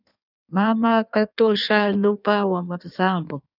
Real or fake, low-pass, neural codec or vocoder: fake; 5.4 kHz; codec, 16 kHz in and 24 kHz out, 1.1 kbps, FireRedTTS-2 codec